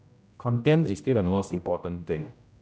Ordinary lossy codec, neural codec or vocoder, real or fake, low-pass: none; codec, 16 kHz, 0.5 kbps, X-Codec, HuBERT features, trained on general audio; fake; none